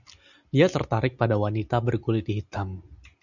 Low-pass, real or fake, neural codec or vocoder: 7.2 kHz; real; none